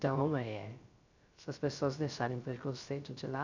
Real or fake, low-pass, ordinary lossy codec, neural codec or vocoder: fake; 7.2 kHz; none; codec, 16 kHz, 0.3 kbps, FocalCodec